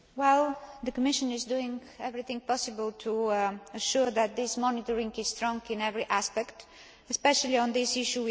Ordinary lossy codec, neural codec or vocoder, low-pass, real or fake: none; none; none; real